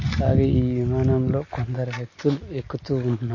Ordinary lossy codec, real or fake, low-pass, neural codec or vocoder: MP3, 32 kbps; real; 7.2 kHz; none